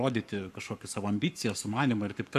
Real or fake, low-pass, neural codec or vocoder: fake; 14.4 kHz; codec, 44.1 kHz, 7.8 kbps, Pupu-Codec